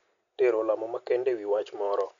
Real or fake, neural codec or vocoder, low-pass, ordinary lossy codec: real; none; 7.2 kHz; none